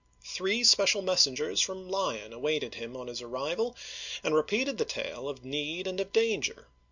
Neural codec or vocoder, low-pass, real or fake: none; 7.2 kHz; real